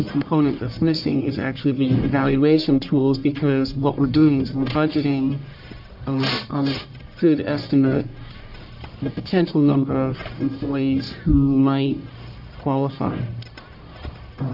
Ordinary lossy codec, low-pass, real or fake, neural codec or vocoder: AAC, 48 kbps; 5.4 kHz; fake; codec, 44.1 kHz, 1.7 kbps, Pupu-Codec